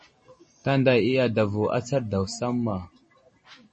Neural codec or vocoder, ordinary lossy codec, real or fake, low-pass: none; MP3, 32 kbps; real; 10.8 kHz